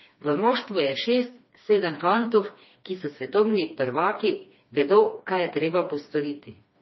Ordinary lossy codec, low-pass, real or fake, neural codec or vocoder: MP3, 24 kbps; 7.2 kHz; fake; codec, 16 kHz, 2 kbps, FreqCodec, smaller model